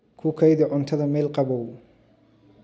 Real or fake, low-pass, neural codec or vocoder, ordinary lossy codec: real; none; none; none